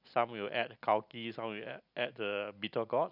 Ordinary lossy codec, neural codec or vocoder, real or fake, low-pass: none; none; real; 5.4 kHz